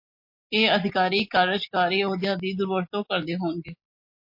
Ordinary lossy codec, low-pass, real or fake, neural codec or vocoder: MP3, 32 kbps; 5.4 kHz; real; none